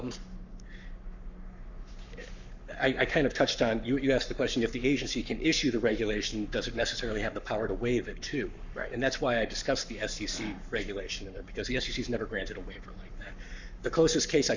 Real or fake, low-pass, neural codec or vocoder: fake; 7.2 kHz; codec, 44.1 kHz, 7.8 kbps, Pupu-Codec